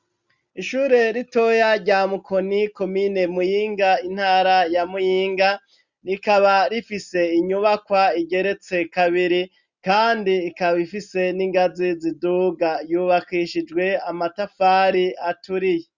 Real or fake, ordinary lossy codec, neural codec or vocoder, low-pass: real; Opus, 64 kbps; none; 7.2 kHz